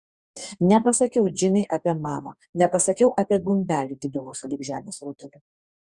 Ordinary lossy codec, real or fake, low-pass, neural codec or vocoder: Opus, 64 kbps; fake; 10.8 kHz; codec, 44.1 kHz, 2.6 kbps, DAC